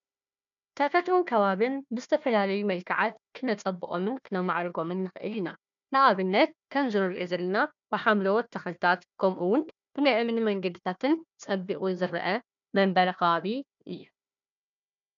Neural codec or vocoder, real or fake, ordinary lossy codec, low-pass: codec, 16 kHz, 1 kbps, FunCodec, trained on Chinese and English, 50 frames a second; fake; MP3, 96 kbps; 7.2 kHz